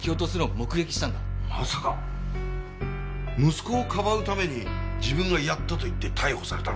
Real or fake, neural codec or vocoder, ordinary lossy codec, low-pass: real; none; none; none